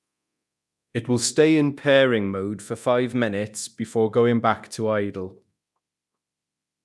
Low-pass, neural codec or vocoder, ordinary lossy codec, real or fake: none; codec, 24 kHz, 0.9 kbps, DualCodec; none; fake